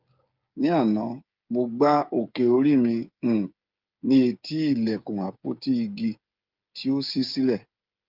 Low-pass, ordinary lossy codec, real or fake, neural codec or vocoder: 5.4 kHz; Opus, 24 kbps; fake; codec, 16 kHz, 8 kbps, FreqCodec, smaller model